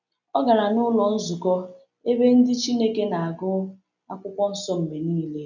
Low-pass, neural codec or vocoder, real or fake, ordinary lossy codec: 7.2 kHz; none; real; none